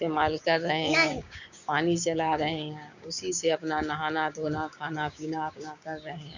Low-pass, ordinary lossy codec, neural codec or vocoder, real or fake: 7.2 kHz; none; codec, 16 kHz, 6 kbps, DAC; fake